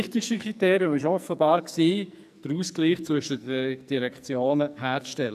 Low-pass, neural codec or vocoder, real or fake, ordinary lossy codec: 14.4 kHz; codec, 44.1 kHz, 2.6 kbps, SNAC; fake; none